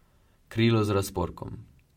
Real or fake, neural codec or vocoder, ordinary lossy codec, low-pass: real; none; MP3, 64 kbps; 19.8 kHz